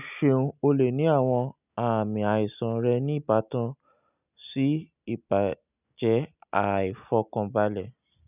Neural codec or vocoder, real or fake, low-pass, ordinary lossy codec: none; real; 3.6 kHz; none